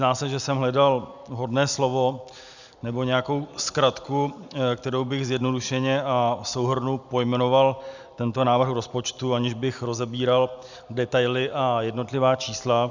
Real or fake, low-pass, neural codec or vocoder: real; 7.2 kHz; none